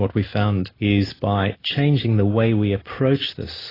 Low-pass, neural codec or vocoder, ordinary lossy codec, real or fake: 5.4 kHz; none; AAC, 24 kbps; real